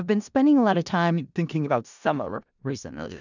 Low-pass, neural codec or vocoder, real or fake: 7.2 kHz; codec, 16 kHz in and 24 kHz out, 0.4 kbps, LongCat-Audio-Codec, four codebook decoder; fake